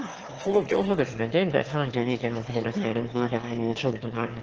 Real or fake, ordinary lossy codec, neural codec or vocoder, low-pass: fake; Opus, 16 kbps; autoencoder, 22.05 kHz, a latent of 192 numbers a frame, VITS, trained on one speaker; 7.2 kHz